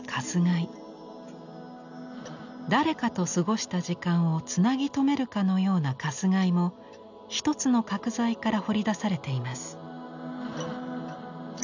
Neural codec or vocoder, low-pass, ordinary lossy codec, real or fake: none; 7.2 kHz; none; real